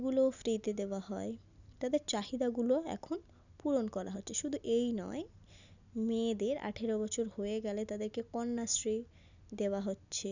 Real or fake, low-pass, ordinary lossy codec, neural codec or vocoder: real; 7.2 kHz; none; none